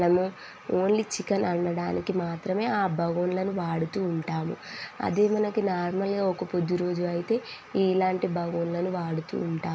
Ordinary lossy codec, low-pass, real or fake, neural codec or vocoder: none; none; real; none